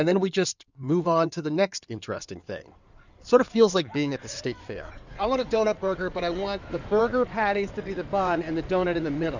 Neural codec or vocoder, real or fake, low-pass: codec, 16 kHz in and 24 kHz out, 2.2 kbps, FireRedTTS-2 codec; fake; 7.2 kHz